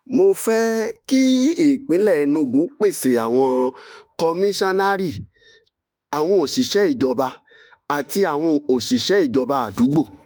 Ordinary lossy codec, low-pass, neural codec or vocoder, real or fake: none; none; autoencoder, 48 kHz, 32 numbers a frame, DAC-VAE, trained on Japanese speech; fake